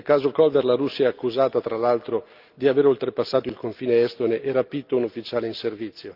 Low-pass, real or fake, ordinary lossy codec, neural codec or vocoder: 5.4 kHz; real; Opus, 32 kbps; none